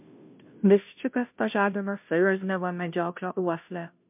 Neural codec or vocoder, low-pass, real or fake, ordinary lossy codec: codec, 16 kHz, 0.5 kbps, FunCodec, trained on Chinese and English, 25 frames a second; 3.6 kHz; fake; MP3, 32 kbps